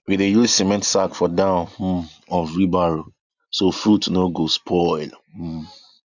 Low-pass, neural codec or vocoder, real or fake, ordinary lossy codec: 7.2 kHz; none; real; none